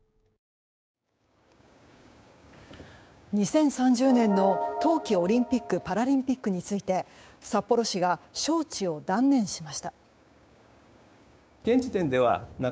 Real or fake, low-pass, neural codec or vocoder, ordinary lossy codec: fake; none; codec, 16 kHz, 6 kbps, DAC; none